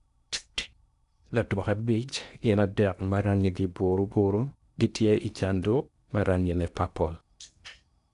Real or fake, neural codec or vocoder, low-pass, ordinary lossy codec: fake; codec, 16 kHz in and 24 kHz out, 0.8 kbps, FocalCodec, streaming, 65536 codes; 10.8 kHz; none